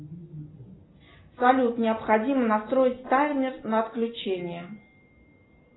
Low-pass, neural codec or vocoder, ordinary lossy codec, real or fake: 7.2 kHz; none; AAC, 16 kbps; real